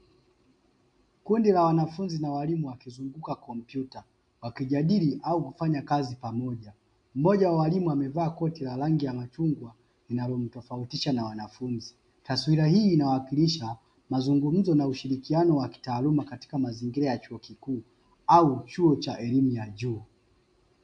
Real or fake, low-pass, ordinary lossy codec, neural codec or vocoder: real; 9.9 kHz; AAC, 64 kbps; none